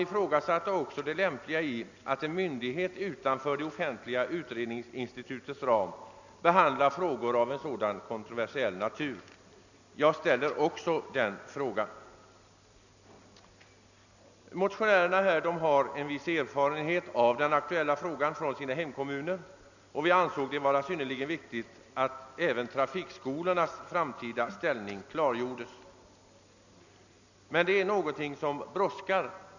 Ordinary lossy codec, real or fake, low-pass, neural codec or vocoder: none; real; 7.2 kHz; none